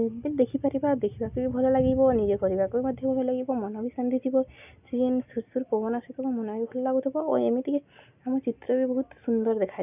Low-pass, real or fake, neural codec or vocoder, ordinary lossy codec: 3.6 kHz; real; none; none